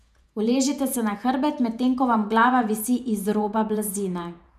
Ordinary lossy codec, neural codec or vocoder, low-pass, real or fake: none; vocoder, 48 kHz, 128 mel bands, Vocos; 14.4 kHz; fake